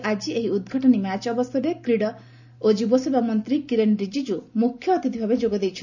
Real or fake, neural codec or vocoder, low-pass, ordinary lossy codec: real; none; 7.2 kHz; none